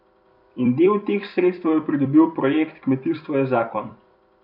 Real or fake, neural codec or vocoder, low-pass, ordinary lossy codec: fake; vocoder, 44.1 kHz, 128 mel bands every 256 samples, BigVGAN v2; 5.4 kHz; none